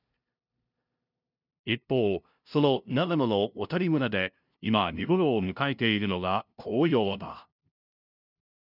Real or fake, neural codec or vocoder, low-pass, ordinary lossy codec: fake; codec, 16 kHz, 0.5 kbps, FunCodec, trained on LibriTTS, 25 frames a second; 5.4 kHz; none